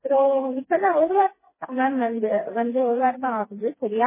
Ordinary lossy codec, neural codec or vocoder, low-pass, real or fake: MP3, 16 kbps; codec, 16 kHz, 2 kbps, FreqCodec, smaller model; 3.6 kHz; fake